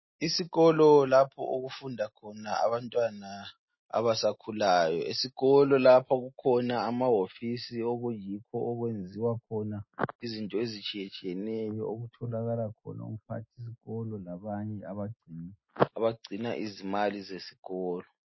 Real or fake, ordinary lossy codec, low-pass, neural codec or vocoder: real; MP3, 24 kbps; 7.2 kHz; none